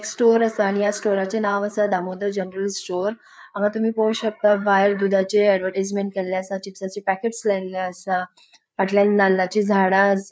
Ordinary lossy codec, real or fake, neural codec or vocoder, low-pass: none; fake; codec, 16 kHz, 4 kbps, FreqCodec, larger model; none